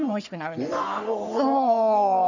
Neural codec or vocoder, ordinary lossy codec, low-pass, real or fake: codec, 44.1 kHz, 3.4 kbps, Pupu-Codec; none; 7.2 kHz; fake